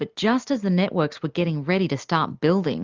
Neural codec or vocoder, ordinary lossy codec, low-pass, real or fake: none; Opus, 32 kbps; 7.2 kHz; real